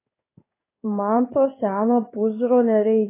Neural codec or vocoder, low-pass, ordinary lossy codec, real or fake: codec, 16 kHz in and 24 kHz out, 1 kbps, XY-Tokenizer; 3.6 kHz; AAC, 24 kbps; fake